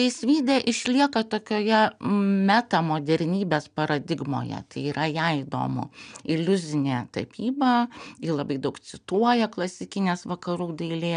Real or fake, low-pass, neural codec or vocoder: real; 9.9 kHz; none